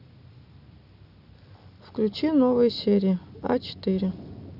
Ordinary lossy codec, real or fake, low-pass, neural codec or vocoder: none; real; 5.4 kHz; none